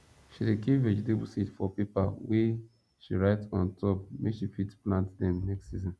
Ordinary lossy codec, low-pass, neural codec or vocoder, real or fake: none; none; none; real